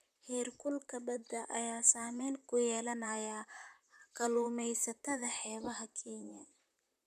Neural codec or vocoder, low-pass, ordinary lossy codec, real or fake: vocoder, 44.1 kHz, 128 mel bands, Pupu-Vocoder; 14.4 kHz; none; fake